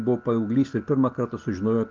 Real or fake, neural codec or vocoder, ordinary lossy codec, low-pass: real; none; Opus, 32 kbps; 7.2 kHz